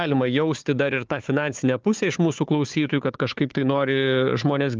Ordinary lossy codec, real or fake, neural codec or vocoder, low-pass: Opus, 24 kbps; fake; codec, 16 kHz, 4 kbps, FunCodec, trained on Chinese and English, 50 frames a second; 7.2 kHz